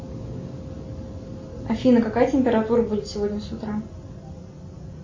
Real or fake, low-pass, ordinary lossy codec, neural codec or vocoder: real; 7.2 kHz; MP3, 32 kbps; none